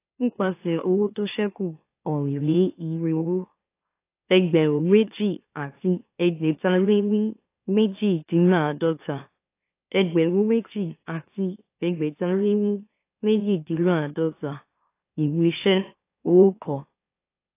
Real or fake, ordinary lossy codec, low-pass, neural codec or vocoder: fake; AAC, 24 kbps; 3.6 kHz; autoencoder, 44.1 kHz, a latent of 192 numbers a frame, MeloTTS